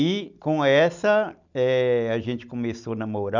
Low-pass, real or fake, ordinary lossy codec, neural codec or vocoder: 7.2 kHz; real; none; none